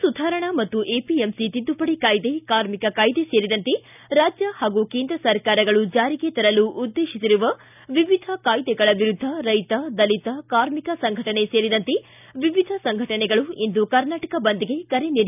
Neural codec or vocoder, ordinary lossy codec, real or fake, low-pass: none; none; real; 3.6 kHz